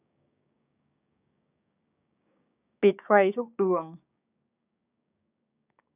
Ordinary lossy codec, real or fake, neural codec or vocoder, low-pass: none; fake; codec, 16 kHz, 6 kbps, DAC; 3.6 kHz